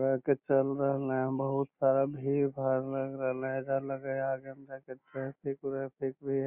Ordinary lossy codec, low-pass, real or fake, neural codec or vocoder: Opus, 64 kbps; 3.6 kHz; real; none